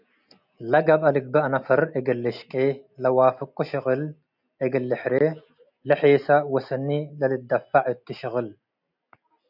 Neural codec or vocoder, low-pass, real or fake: none; 5.4 kHz; real